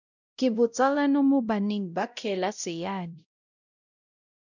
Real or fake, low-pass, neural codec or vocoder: fake; 7.2 kHz; codec, 16 kHz, 0.5 kbps, X-Codec, WavLM features, trained on Multilingual LibriSpeech